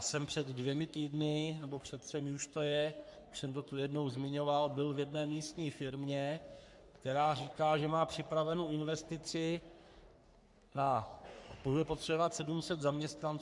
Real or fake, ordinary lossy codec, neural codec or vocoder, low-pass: fake; MP3, 96 kbps; codec, 44.1 kHz, 3.4 kbps, Pupu-Codec; 10.8 kHz